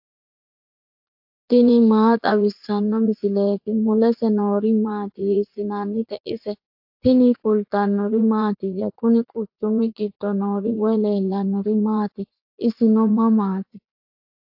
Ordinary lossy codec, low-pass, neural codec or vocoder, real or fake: AAC, 48 kbps; 5.4 kHz; vocoder, 44.1 kHz, 80 mel bands, Vocos; fake